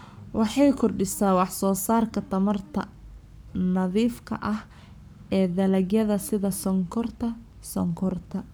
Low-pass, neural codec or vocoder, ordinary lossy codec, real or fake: none; codec, 44.1 kHz, 7.8 kbps, Pupu-Codec; none; fake